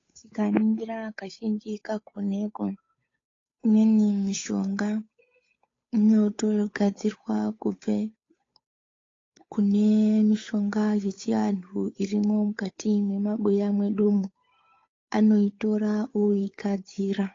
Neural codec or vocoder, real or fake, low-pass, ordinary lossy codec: codec, 16 kHz, 8 kbps, FunCodec, trained on Chinese and English, 25 frames a second; fake; 7.2 kHz; AAC, 32 kbps